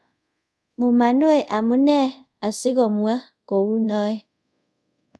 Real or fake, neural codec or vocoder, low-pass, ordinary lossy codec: fake; codec, 24 kHz, 0.5 kbps, DualCodec; none; none